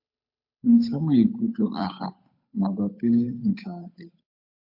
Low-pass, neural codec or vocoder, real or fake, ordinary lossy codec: 5.4 kHz; codec, 16 kHz, 8 kbps, FunCodec, trained on Chinese and English, 25 frames a second; fake; AAC, 48 kbps